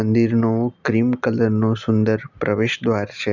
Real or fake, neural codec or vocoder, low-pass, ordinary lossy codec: real; none; 7.2 kHz; none